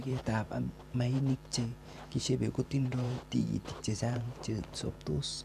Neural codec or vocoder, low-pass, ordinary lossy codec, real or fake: vocoder, 44.1 kHz, 128 mel bands every 512 samples, BigVGAN v2; 14.4 kHz; none; fake